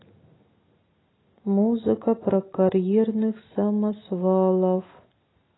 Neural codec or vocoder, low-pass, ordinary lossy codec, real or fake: none; 7.2 kHz; AAC, 16 kbps; real